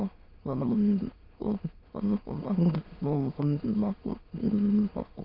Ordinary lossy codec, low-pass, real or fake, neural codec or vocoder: Opus, 24 kbps; 5.4 kHz; fake; autoencoder, 22.05 kHz, a latent of 192 numbers a frame, VITS, trained on many speakers